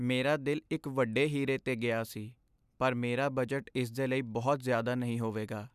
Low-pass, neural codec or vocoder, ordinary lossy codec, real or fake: 14.4 kHz; none; none; real